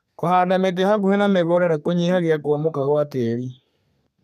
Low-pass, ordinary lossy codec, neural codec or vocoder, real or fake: 14.4 kHz; none; codec, 32 kHz, 1.9 kbps, SNAC; fake